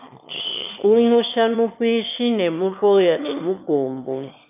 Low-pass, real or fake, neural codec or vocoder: 3.6 kHz; fake; autoencoder, 22.05 kHz, a latent of 192 numbers a frame, VITS, trained on one speaker